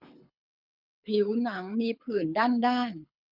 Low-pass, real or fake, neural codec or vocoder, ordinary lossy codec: 5.4 kHz; fake; codec, 24 kHz, 6 kbps, HILCodec; none